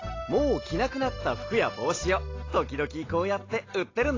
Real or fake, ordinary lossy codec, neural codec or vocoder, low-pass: real; AAC, 32 kbps; none; 7.2 kHz